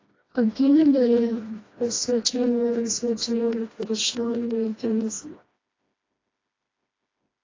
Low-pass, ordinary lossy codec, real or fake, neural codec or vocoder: 7.2 kHz; AAC, 32 kbps; fake; codec, 16 kHz, 1 kbps, FreqCodec, smaller model